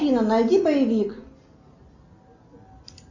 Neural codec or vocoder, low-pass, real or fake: none; 7.2 kHz; real